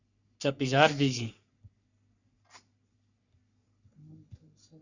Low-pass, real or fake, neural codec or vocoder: 7.2 kHz; fake; codec, 44.1 kHz, 3.4 kbps, Pupu-Codec